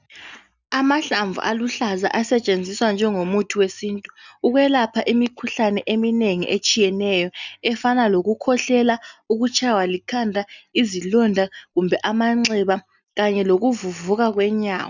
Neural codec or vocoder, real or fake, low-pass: none; real; 7.2 kHz